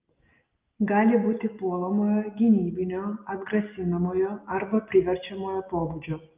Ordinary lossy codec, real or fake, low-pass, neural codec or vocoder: Opus, 32 kbps; real; 3.6 kHz; none